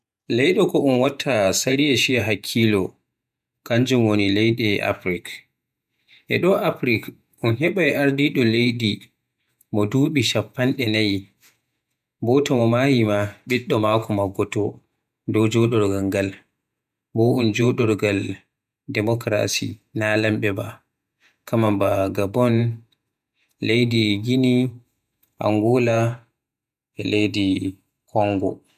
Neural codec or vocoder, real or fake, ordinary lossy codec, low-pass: vocoder, 48 kHz, 128 mel bands, Vocos; fake; none; 14.4 kHz